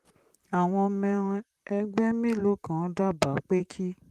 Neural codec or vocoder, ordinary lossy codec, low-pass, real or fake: vocoder, 44.1 kHz, 128 mel bands, Pupu-Vocoder; Opus, 32 kbps; 14.4 kHz; fake